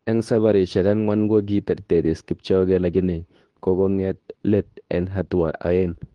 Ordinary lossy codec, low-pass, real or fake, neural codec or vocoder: Opus, 24 kbps; 10.8 kHz; fake; codec, 24 kHz, 0.9 kbps, WavTokenizer, medium speech release version 2